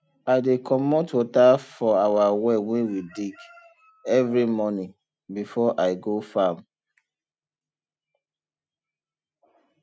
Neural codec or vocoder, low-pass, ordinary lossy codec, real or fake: none; none; none; real